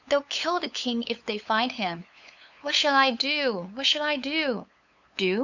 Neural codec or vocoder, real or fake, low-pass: codec, 16 kHz, 8 kbps, FunCodec, trained on LibriTTS, 25 frames a second; fake; 7.2 kHz